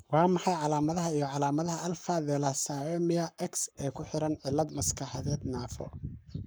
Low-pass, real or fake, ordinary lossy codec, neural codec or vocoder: none; fake; none; codec, 44.1 kHz, 7.8 kbps, Pupu-Codec